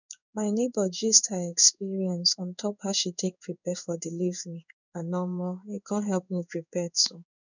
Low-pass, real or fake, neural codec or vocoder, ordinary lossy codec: 7.2 kHz; fake; codec, 16 kHz in and 24 kHz out, 1 kbps, XY-Tokenizer; none